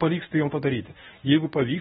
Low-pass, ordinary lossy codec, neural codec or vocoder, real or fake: 10.8 kHz; AAC, 16 kbps; codec, 24 kHz, 0.9 kbps, WavTokenizer, medium speech release version 1; fake